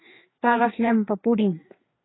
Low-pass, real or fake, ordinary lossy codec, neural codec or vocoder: 7.2 kHz; fake; AAC, 16 kbps; codec, 16 kHz, 2 kbps, FreqCodec, larger model